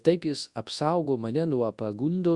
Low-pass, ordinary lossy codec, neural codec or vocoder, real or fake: 10.8 kHz; Opus, 64 kbps; codec, 24 kHz, 0.9 kbps, WavTokenizer, large speech release; fake